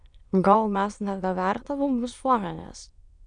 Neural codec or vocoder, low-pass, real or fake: autoencoder, 22.05 kHz, a latent of 192 numbers a frame, VITS, trained on many speakers; 9.9 kHz; fake